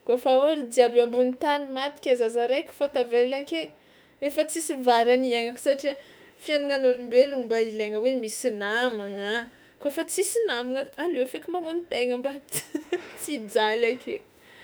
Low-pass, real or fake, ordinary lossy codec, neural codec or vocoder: none; fake; none; autoencoder, 48 kHz, 32 numbers a frame, DAC-VAE, trained on Japanese speech